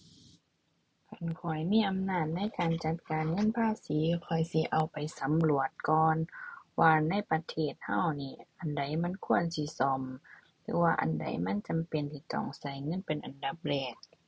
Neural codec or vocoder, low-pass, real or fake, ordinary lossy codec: none; none; real; none